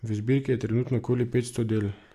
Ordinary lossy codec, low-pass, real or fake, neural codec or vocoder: MP3, 96 kbps; 14.4 kHz; real; none